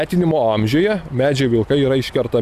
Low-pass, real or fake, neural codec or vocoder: 14.4 kHz; real; none